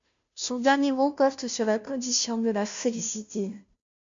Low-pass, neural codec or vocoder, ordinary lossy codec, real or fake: 7.2 kHz; codec, 16 kHz, 0.5 kbps, FunCodec, trained on Chinese and English, 25 frames a second; MP3, 64 kbps; fake